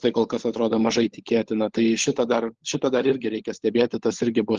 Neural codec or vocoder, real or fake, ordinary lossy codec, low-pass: codec, 16 kHz, 16 kbps, FunCodec, trained on LibriTTS, 50 frames a second; fake; Opus, 16 kbps; 7.2 kHz